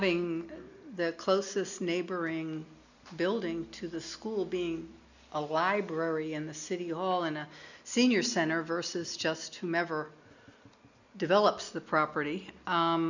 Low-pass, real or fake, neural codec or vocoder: 7.2 kHz; real; none